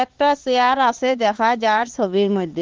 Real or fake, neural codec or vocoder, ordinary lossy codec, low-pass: fake; codec, 16 kHz, 4 kbps, X-Codec, HuBERT features, trained on LibriSpeech; Opus, 16 kbps; 7.2 kHz